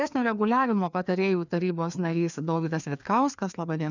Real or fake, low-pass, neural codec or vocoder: fake; 7.2 kHz; codec, 16 kHz, 2 kbps, FreqCodec, larger model